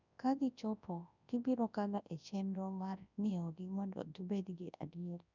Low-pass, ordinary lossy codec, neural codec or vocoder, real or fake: 7.2 kHz; none; codec, 24 kHz, 0.9 kbps, WavTokenizer, large speech release; fake